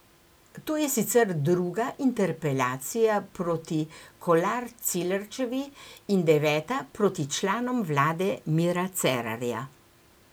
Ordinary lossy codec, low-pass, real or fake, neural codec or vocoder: none; none; real; none